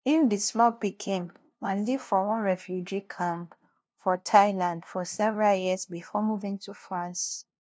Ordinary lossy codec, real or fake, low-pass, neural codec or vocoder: none; fake; none; codec, 16 kHz, 0.5 kbps, FunCodec, trained on LibriTTS, 25 frames a second